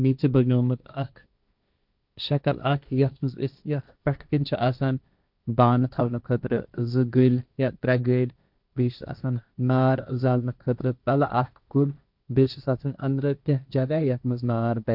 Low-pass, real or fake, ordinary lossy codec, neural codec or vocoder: 5.4 kHz; fake; none; codec, 16 kHz, 1.1 kbps, Voila-Tokenizer